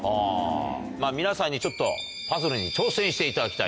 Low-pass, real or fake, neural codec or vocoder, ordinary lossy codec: none; real; none; none